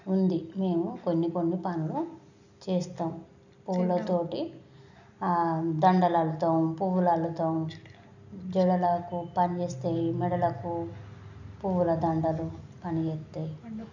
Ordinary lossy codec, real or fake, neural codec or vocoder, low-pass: none; real; none; 7.2 kHz